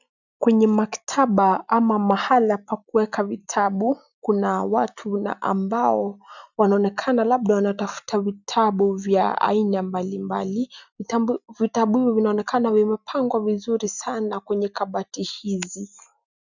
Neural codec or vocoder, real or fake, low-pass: none; real; 7.2 kHz